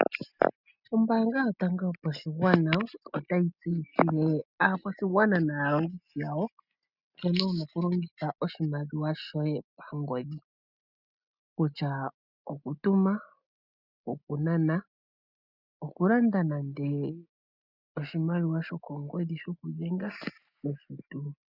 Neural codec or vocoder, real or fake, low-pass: none; real; 5.4 kHz